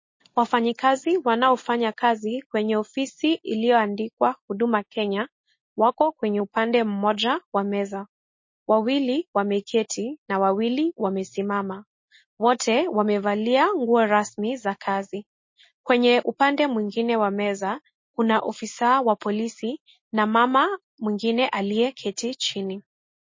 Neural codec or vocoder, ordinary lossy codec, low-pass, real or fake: none; MP3, 32 kbps; 7.2 kHz; real